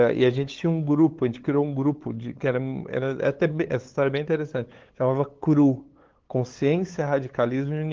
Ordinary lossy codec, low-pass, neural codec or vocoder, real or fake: Opus, 16 kbps; 7.2 kHz; codec, 16 kHz, 8 kbps, FunCodec, trained on LibriTTS, 25 frames a second; fake